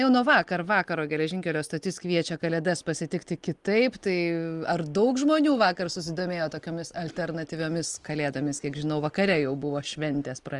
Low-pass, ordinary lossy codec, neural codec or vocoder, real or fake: 10.8 kHz; Opus, 24 kbps; none; real